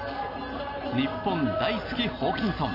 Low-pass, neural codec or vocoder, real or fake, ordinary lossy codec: 5.4 kHz; none; real; AAC, 32 kbps